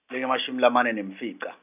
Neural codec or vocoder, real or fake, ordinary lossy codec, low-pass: none; real; none; 3.6 kHz